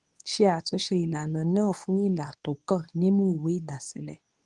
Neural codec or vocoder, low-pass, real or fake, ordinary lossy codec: codec, 24 kHz, 0.9 kbps, WavTokenizer, medium speech release version 2; 10.8 kHz; fake; Opus, 32 kbps